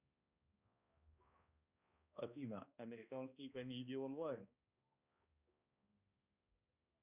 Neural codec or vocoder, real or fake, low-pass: codec, 16 kHz, 1 kbps, X-Codec, HuBERT features, trained on balanced general audio; fake; 3.6 kHz